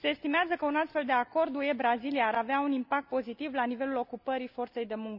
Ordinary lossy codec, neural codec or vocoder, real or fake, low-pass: none; none; real; 5.4 kHz